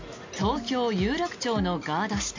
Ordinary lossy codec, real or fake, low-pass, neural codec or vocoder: MP3, 48 kbps; real; 7.2 kHz; none